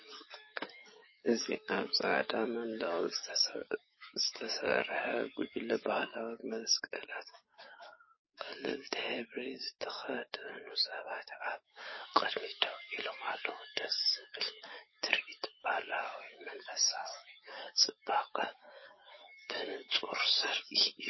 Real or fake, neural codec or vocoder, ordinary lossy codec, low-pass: fake; codec, 44.1 kHz, 7.8 kbps, DAC; MP3, 24 kbps; 7.2 kHz